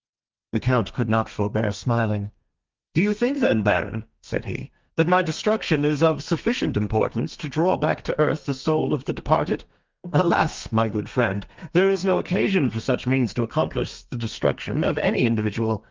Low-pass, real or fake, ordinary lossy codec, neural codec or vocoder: 7.2 kHz; fake; Opus, 24 kbps; codec, 32 kHz, 1.9 kbps, SNAC